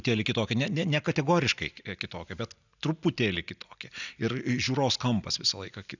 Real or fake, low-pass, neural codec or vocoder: real; 7.2 kHz; none